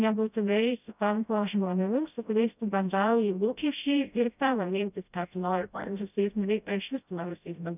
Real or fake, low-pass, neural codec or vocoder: fake; 3.6 kHz; codec, 16 kHz, 0.5 kbps, FreqCodec, smaller model